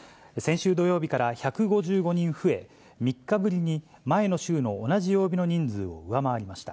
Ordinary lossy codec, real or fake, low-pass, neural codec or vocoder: none; real; none; none